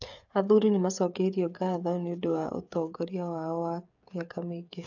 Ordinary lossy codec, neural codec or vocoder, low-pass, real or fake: none; codec, 16 kHz, 8 kbps, FreqCodec, smaller model; 7.2 kHz; fake